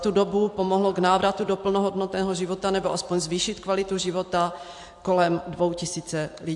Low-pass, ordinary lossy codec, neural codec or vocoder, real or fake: 10.8 kHz; AAC, 64 kbps; vocoder, 44.1 kHz, 128 mel bands every 256 samples, BigVGAN v2; fake